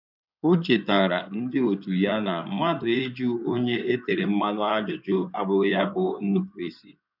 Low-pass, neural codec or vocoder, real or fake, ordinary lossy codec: 5.4 kHz; codec, 16 kHz in and 24 kHz out, 2.2 kbps, FireRedTTS-2 codec; fake; none